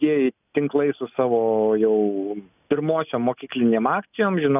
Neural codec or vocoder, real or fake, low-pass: none; real; 3.6 kHz